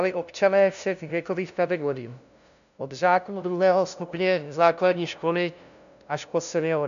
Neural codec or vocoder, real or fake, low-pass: codec, 16 kHz, 0.5 kbps, FunCodec, trained on LibriTTS, 25 frames a second; fake; 7.2 kHz